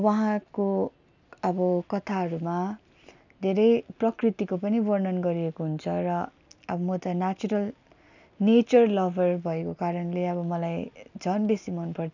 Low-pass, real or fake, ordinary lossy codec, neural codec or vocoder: 7.2 kHz; real; none; none